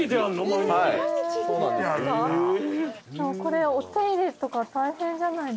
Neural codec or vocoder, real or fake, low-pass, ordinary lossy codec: none; real; none; none